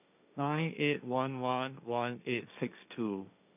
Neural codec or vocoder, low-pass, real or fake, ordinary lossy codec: codec, 16 kHz, 1.1 kbps, Voila-Tokenizer; 3.6 kHz; fake; none